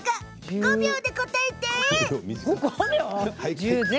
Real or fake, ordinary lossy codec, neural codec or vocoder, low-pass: real; none; none; none